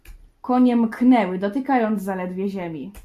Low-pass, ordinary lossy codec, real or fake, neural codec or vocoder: 14.4 kHz; MP3, 64 kbps; real; none